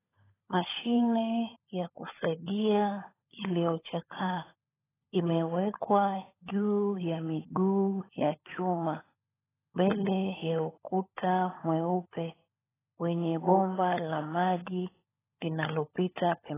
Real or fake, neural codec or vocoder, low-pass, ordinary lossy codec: fake; codec, 16 kHz, 16 kbps, FunCodec, trained on LibriTTS, 50 frames a second; 3.6 kHz; AAC, 16 kbps